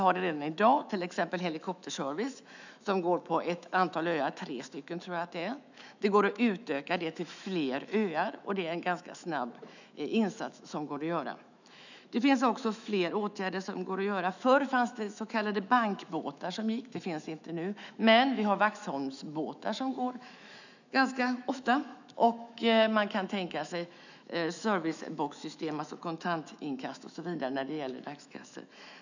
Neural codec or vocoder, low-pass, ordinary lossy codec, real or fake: autoencoder, 48 kHz, 128 numbers a frame, DAC-VAE, trained on Japanese speech; 7.2 kHz; none; fake